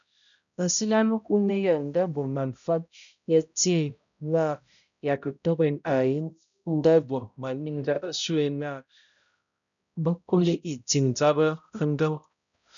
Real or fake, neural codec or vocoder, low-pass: fake; codec, 16 kHz, 0.5 kbps, X-Codec, HuBERT features, trained on balanced general audio; 7.2 kHz